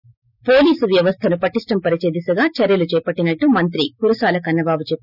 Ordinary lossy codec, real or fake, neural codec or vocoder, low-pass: none; real; none; 5.4 kHz